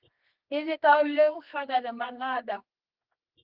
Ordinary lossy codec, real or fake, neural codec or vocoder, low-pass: Opus, 32 kbps; fake; codec, 24 kHz, 0.9 kbps, WavTokenizer, medium music audio release; 5.4 kHz